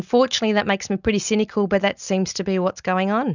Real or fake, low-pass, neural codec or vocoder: real; 7.2 kHz; none